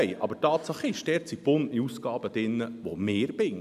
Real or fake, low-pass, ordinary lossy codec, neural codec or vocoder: real; 14.4 kHz; none; none